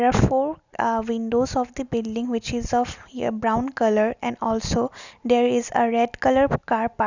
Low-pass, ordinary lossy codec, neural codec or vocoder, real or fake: 7.2 kHz; none; none; real